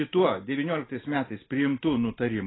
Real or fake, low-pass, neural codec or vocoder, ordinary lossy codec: real; 7.2 kHz; none; AAC, 16 kbps